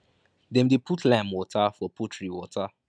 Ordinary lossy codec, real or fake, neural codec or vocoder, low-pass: none; real; none; 9.9 kHz